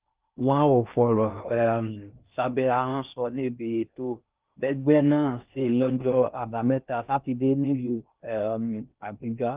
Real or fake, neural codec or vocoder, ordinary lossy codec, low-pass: fake; codec, 16 kHz in and 24 kHz out, 0.6 kbps, FocalCodec, streaming, 4096 codes; Opus, 24 kbps; 3.6 kHz